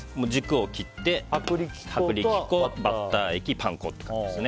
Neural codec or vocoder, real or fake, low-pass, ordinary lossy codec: none; real; none; none